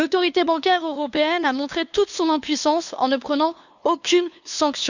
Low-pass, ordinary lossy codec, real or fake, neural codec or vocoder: 7.2 kHz; none; fake; codec, 16 kHz, 2 kbps, FunCodec, trained on LibriTTS, 25 frames a second